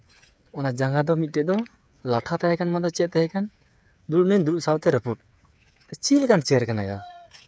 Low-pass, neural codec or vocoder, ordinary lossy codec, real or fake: none; codec, 16 kHz, 8 kbps, FreqCodec, smaller model; none; fake